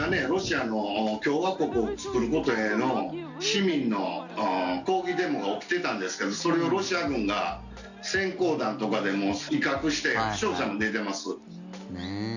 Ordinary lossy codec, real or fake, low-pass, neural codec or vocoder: none; real; 7.2 kHz; none